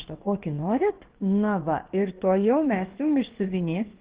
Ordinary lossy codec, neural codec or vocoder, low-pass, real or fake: Opus, 16 kbps; codec, 16 kHz, about 1 kbps, DyCAST, with the encoder's durations; 3.6 kHz; fake